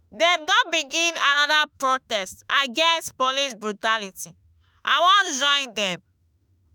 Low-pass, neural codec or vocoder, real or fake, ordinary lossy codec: none; autoencoder, 48 kHz, 32 numbers a frame, DAC-VAE, trained on Japanese speech; fake; none